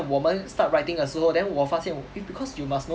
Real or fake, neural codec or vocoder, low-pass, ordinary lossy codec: real; none; none; none